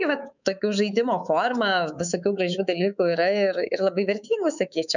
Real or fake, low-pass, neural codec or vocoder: fake; 7.2 kHz; codec, 24 kHz, 3.1 kbps, DualCodec